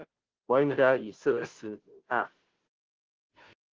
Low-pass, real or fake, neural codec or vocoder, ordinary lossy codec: 7.2 kHz; fake; codec, 16 kHz, 0.5 kbps, FunCodec, trained on Chinese and English, 25 frames a second; Opus, 16 kbps